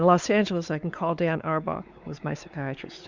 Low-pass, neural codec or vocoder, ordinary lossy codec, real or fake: 7.2 kHz; codec, 16 kHz, 4 kbps, X-Codec, WavLM features, trained on Multilingual LibriSpeech; Opus, 64 kbps; fake